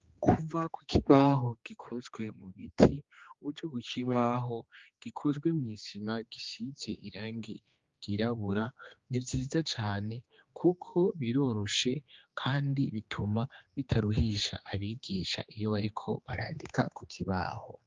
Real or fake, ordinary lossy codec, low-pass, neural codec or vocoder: fake; Opus, 32 kbps; 7.2 kHz; codec, 16 kHz, 2 kbps, X-Codec, HuBERT features, trained on general audio